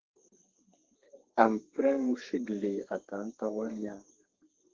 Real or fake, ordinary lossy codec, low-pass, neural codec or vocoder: fake; Opus, 16 kbps; 7.2 kHz; codec, 32 kHz, 1.9 kbps, SNAC